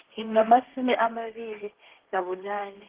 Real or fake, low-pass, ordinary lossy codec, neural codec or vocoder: fake; 3.6 kHz; Opus, 16 kbps; codec, 16 kHz, 1.1 kbps, Voila-Tokenizer